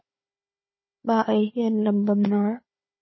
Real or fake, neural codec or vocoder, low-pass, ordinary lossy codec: fake; codec, 16 kHz, 4 kbps, FunCodec, trained on Chinese and English, 50 frames a second; 7.2 kHz; MP3, 24 kbps